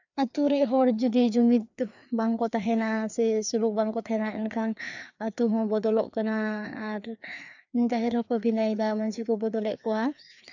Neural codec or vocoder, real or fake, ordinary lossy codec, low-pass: codec, 16 kHz, 2 kbps, FreqCodec, larger model; fake; none; 7.2 kHz